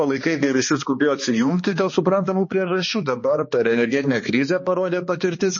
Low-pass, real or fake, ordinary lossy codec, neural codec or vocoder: 7.2 kHz; fake; MP3, 32 kbps; codec, 16 kHz, 2 kbps, X-Codec, HuBERT features, trained on balanced general audio